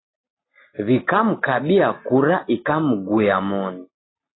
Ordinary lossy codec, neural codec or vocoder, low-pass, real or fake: AAC, 16 kbps; none; 7.2 kHz; real